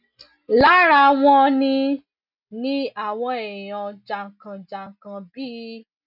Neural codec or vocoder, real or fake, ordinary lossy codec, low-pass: none; real; none; 5.4 kHz